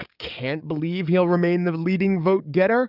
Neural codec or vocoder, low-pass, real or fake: codec, 44.1 kHz, 7.8 kbps, DAC; 5.4 kHz; fake